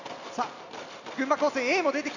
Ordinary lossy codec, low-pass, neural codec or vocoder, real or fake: none; 7.2 kHz; none; real